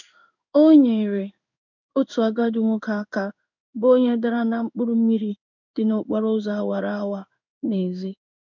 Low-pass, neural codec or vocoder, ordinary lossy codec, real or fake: 7.2 kHz; codec, 16 kHz in and 24 kHz out, 1 kbps, XY-Tokenizer; none; fake